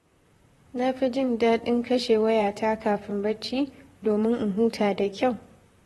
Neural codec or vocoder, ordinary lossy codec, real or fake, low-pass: vocoder, 44.1 kHz, 128 mel bands, Pupu-Vocoder; AAC, 32 kbps; fake; 19.8 kHz